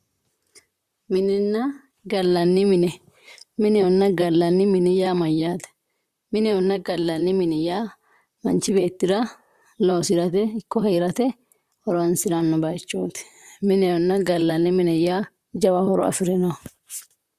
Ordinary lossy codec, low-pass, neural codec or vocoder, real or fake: Opus, 64 kbps; 14.4 kHz; vocoder, 44.1 kHz, 128 mel bands, Pupu-Vocoder; fake